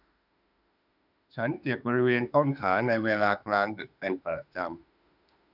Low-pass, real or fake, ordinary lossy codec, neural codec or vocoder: 5.4 kHz; fake; none; autoencoder, 48 kHz, 32 numbers a frame, DAC-VAE, trained on Japanese speech